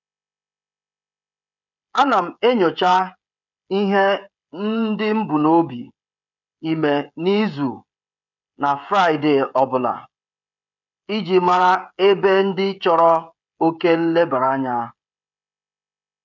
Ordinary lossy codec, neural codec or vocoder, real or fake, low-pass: none; codec, 16 kHz, 16 kbps, FreqCodec, smaller model; fake; 7.2 kHz